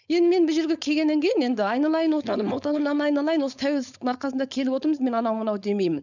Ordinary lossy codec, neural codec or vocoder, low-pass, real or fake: none; codec, 16 kHz, 4.8 kbps, FACodec; 7.2 kHz; fake